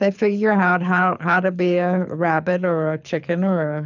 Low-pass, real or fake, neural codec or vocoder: 7.2 kHz; fake; codec, 24 kHz, 6 kbps, HILCodec